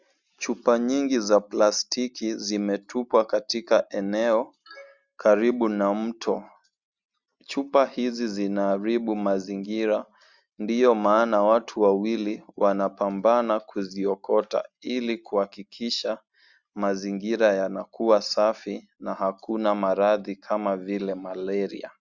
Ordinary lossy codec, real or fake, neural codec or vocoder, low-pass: Opus, 64 kbps; real; none; 7.2 kHz